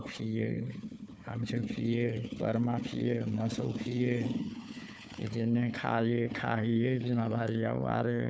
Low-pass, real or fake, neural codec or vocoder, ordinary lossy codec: none; fake; codec, 16 kHz, 4 kbps, FunCodec, trained on Chinese and English, 50 frames a second; none